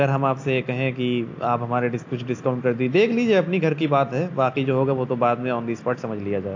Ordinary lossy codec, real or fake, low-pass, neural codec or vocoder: AAC, 48 kbps; real; 7.2 kHz; none